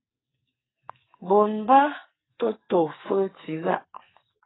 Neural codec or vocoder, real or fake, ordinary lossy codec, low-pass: codec, 44.1 kHz, 2.6 kbps, SNAC; fake; AAC, 16 kbps; 7.2 kHz